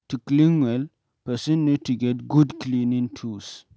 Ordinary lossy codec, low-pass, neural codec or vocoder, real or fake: none; none; none; real